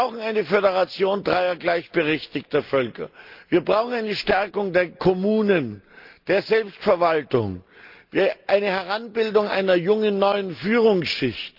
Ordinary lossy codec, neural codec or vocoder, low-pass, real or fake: Opus, 24 kbps; none; 5.4 kHz; real